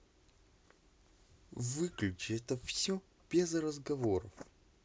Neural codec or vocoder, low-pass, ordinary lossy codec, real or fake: none; none; none; real